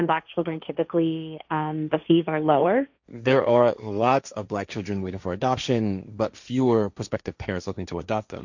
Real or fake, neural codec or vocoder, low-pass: fake; codec, 16 kHz, 1.1 kbps, Voila-Tokenizer; 7.2 kHz